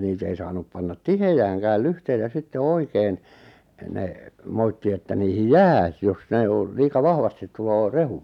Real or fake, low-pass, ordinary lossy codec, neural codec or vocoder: real; 19.8 kHz; none; none